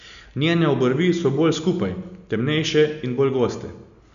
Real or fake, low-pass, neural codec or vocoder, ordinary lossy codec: real; 7.2 kHz; none; none